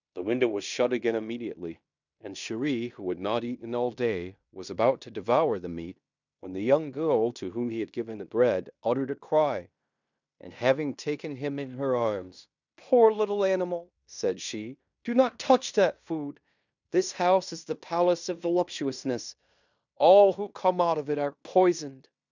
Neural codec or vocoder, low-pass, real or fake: codec, 16 kHz in and 24 kHz out, 0.9 kbps, LongCat-Audio-Codec, fine tuned four codebook decoder; 7.2 kHz; fake